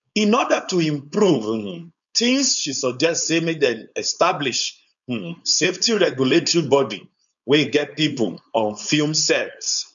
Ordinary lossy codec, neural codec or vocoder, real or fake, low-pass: none; codec, 16 kHz, 4.8 kbps, FACodec; fake; 7.2 kHz